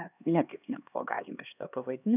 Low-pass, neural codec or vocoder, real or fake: 3.6 kHz; codec, 16 kHz, 2 kbps, X-Codec, HuBERT features, trained on LibriSpeech; fake